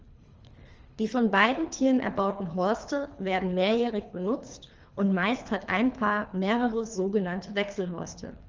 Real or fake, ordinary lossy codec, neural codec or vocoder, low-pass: fake; Opus, 24 kbps; codec, 24 kHz, 3 kbps, HILCodec; 7.2 kHz